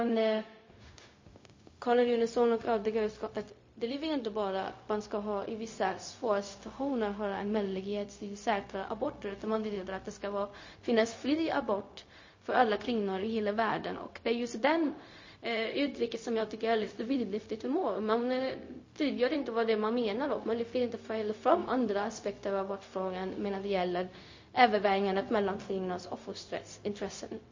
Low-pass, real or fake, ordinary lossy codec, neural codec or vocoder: 7.2 kHz; fake; MP3, 32 kbps; codec, 16 kHz, 0.4 kbps, LongCat-Audio-Codec